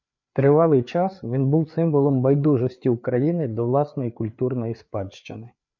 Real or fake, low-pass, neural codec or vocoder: fake; 7.2 kHz; codec, 16 kHz, 4 kbps, FreqCodec, larger model